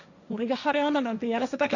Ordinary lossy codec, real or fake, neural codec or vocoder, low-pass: none; fake; codec, 16 kHz, 1.1 kbps, Voila-Tokenizer; 7.2 kHz